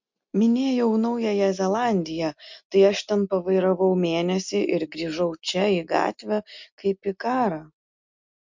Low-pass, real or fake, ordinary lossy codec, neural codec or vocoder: 7.2 kHz; real; MP3, 64 kbps; none